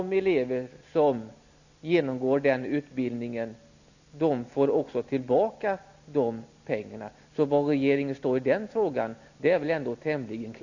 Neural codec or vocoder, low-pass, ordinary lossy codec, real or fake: none; 7.2 kHz; none; real